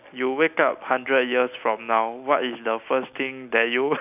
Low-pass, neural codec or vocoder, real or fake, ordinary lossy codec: 3.6 kHz; none; real; none